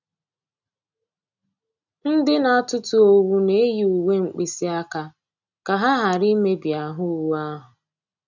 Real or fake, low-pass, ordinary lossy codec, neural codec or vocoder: real; 7.2 kHz; none; none